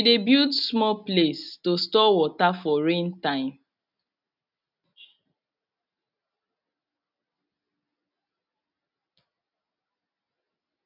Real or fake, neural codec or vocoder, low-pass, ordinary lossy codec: real; none; 5.4 kHz; none